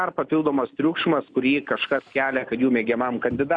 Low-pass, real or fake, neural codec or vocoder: 9.9 kHz; real; none